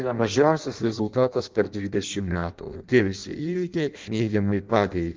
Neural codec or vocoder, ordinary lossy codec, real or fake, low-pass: codec, 16 kHz in and 24 kHz out, 0.6 kbps, FireRedTTS-2 codec; Opus, 32 kbps; fake; 7.2 kHz